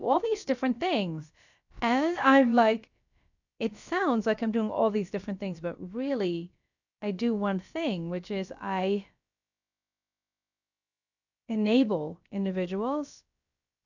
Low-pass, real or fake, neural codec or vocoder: 7.2 kHz; fake; codec, 16 kHz, about 1 kbps, DyCAST, with the encoder's durations